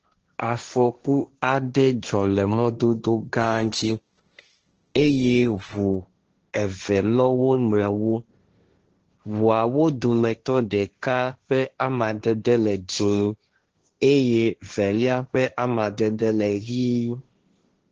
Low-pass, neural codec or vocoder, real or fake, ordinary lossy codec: 7.2 kHz; codec, 16 kHz, 1.1 kbps, Voila-Tokenizer; fake; Opus, 16 kbps